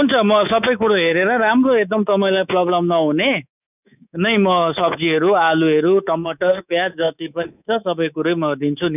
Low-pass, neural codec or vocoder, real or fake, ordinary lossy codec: 3.6 kHz; codec, 24 kHz, 3.1 kbps, DualCodec; fake; none